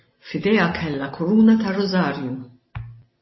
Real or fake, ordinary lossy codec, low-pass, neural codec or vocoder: real; MP3, 24 kbps; 7.2 kHz; none